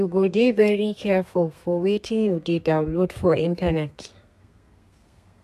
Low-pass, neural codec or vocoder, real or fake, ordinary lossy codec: 14.4 kHz; codec, 32 kHz, 1.9 kbps, SNAC; fake; AAC, 96 kbps